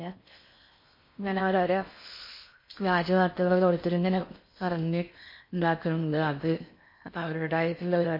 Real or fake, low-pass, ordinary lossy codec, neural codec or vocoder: fake; 5.4 kHz; MP3, 32 kbps; codec, 16 kHz in and 24 kHz out, 0.6 kbps, FocalCodec, streaming, 4096 codes